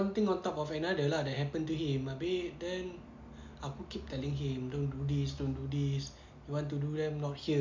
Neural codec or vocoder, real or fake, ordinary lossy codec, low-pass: none; real; MP3, 64 kbps; 7.2 kHz